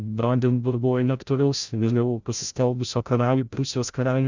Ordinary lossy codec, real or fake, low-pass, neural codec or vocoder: Opus, 64 kbps; fake; 7.2 kHz; codec, 16 kHz, 0.5 kbps, FreqCodec, larger model